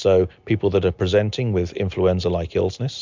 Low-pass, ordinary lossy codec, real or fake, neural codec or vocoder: 7.2 kHz; MP3, 64 kbps; real; none